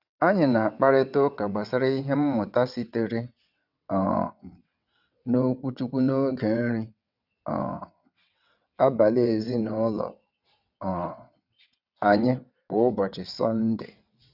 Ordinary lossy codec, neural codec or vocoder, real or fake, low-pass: none; vocoder, 22.05 kHz, 80 mel bands, WaveNeXt; fake; 5.4 kHz